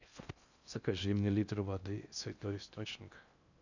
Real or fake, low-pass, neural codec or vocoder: fake; 7.2 kHz; codec, 16 kHz in and 24 kHz out, 0.6 kbps, FocalCodec, streaming, 4096 codes